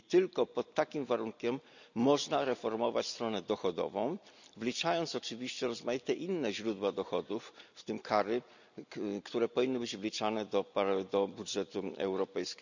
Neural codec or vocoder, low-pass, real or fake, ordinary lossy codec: none; 7.2 kHz; real; none